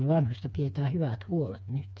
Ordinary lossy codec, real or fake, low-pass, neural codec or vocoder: none; fake; none; codec, 16 kHz, 4 kbps, FreqCodec, smaller model